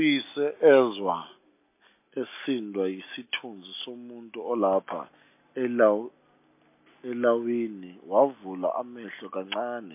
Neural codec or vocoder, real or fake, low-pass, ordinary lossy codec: none; real; 3.6 kHz; MP3, 24 kbps